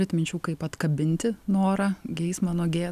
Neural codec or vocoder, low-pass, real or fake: none; 14.4 kHz; real